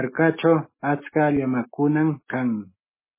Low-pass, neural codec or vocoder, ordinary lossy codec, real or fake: 3.6 kHz; none; MP3, 16 kbps; real